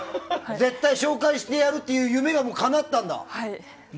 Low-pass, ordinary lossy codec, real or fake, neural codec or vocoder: none; none; real; none